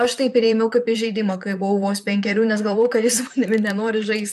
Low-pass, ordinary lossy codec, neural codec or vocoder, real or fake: 14.4 kHz; MP3, 96 kbps; vocoder, 44.1 kHz, 128 mel bands, Pupu-Vocoder; fake